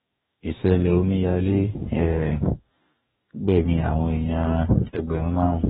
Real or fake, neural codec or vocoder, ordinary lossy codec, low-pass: fake; codec, 44.1 kHz, 2.6 kbps, DAC; AAC, 16 kbps; 19.8 kHz